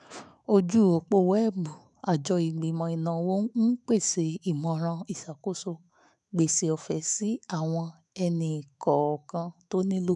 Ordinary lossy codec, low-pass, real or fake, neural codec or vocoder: none; 10.8 kHz; fake; autoencoder, 48 kHz, 128 numbers a frame, DAC-VAE, trained on Japanese speech